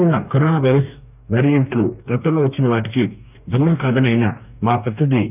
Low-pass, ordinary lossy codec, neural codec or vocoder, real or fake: 3.6 kHz; none; codec, 44.1 kHz, 2.6 kbps, DAC; fake